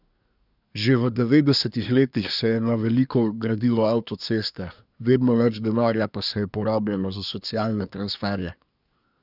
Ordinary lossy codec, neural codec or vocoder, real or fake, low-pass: none; codec, 24 kHz, 1 kbps, SNAC; fake; 5.4 kHz